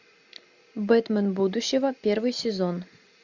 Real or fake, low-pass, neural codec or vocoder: real; 7.2 kHz; none